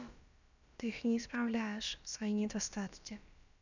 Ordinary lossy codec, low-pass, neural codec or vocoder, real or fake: none; 7.2 kHz; codec, 16 kHz, about 1 kbps, DyCAST, with the encoder's durations; fake